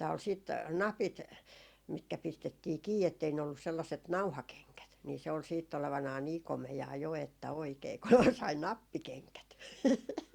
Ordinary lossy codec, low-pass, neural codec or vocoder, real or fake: Opus, 64 kbps; 19.8 kHz; none; real